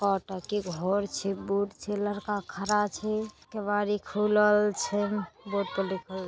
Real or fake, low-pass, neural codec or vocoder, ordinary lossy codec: real; none; none; none